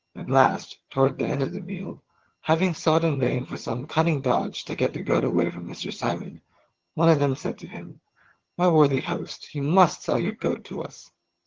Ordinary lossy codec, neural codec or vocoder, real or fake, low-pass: Opus, 16 kbps; vocoder, 22.05 kHz, 80 mel bands, HiFi-GAN; fake; 7.2 kHz